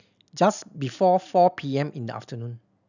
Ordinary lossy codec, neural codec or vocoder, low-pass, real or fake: none; none; 7.2 kHz; real